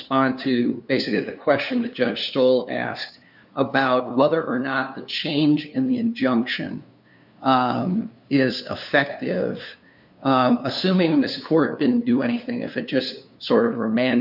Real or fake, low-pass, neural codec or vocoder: fake; 5.4 kHz; codec, 16 kHz, 2 kbps, FunCodec, trained on LibriTTS, 25 frames a second